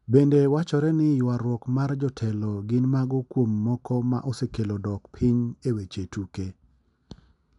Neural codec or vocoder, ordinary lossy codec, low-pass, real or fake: none; none; 10.8 kHz; real